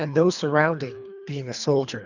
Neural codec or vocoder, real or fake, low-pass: codec, 24 kHz, 3 kbps, HILCodec; fake; 7.2 kHz